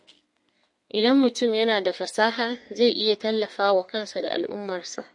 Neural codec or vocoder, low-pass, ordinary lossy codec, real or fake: codec, 32 kHz, 1.9 kbps, SNAC; 10.8 kHz; MP3, 48 kbps; fake